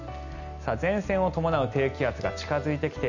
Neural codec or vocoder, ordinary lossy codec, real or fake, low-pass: none; none; real; 7.2 kHz